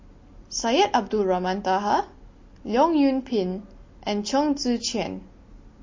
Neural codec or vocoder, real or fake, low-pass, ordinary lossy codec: none; real; 7.2 kHz; MP3, 32 kbps